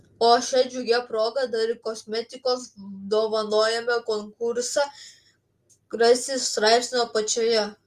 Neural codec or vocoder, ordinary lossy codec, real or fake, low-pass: none; Opus, 32 kbps; real; 14.4 kHz